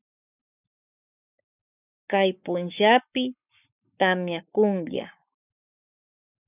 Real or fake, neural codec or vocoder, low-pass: fake; codec, 44.1 kHz, 7.8 kbps, Pupu-Codec; 3.6 kHz